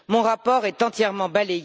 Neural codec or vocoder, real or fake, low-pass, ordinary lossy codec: none; real; none; none